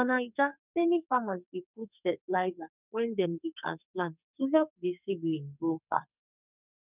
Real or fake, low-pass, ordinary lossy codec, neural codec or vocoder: fake; 3.6 kHz; none; codec, 44.1 kHz, 2.6 kbps, SNAC